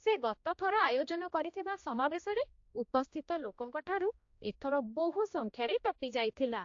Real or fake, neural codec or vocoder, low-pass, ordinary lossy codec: fake; codec, 16 kHz, 1 kbps, X-Codec, HuBERT features, trained on general audio; 7.2 kHz; AAC, 48 kbps